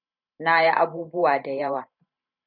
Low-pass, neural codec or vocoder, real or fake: 5.4 kHz; vocoder, 44.1 kHz, 128 mel bands every 512 samples, BigVGAN v2; fake